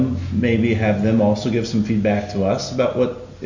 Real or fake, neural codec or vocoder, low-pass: real; none; 7.2 kHz